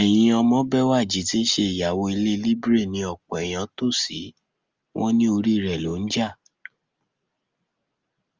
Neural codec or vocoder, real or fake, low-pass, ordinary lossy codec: none; real; 7.2 kHz; Opus, 32 kbps